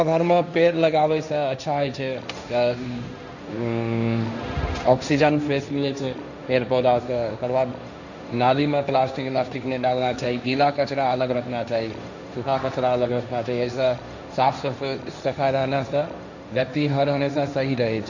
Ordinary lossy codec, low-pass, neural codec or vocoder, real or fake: none; 7.2 kHz; codec, 16 kHz, 1.1 kbps, Voila-Tokenizer; fake